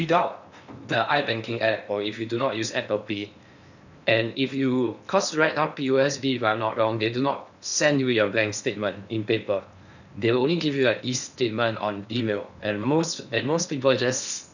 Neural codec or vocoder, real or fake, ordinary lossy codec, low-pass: codec, 16 kHz in and 24 kHz out, 0.8 kbps, FocalCodec, streaming, 65536 codes; fake; none; 7.2 kHz